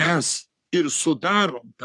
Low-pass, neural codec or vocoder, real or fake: 10.8 kHz; codec, 44.1 kHz, 3.4 kbps, Pupu-Codec; fake